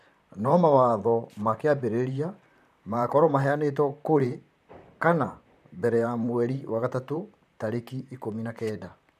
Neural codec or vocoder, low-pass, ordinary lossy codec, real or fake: vocoder, 44.1 kHz, 128 mel bands, Pupu-Vocoder; 14.4 kHz; none; fake